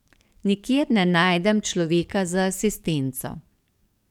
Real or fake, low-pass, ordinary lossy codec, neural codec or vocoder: fake; 19.8 kHz; none; codec, 44.1 kHz, 7.8 kbps, DAC